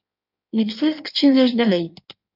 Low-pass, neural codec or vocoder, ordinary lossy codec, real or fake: 5.4 kHz; codec, 16 kHz in and 24 kHz out, 1.1 kbps, FireRedTTS-2 codec; AAC, 48 kbps; fake